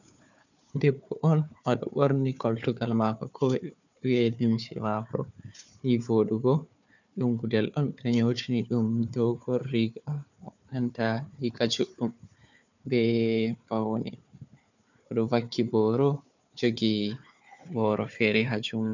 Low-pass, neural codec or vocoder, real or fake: 7.2 kHz; codec, 16 kHz, 4 kbps, FunCodec, trained on Chinese and English, 50 frames a second; fake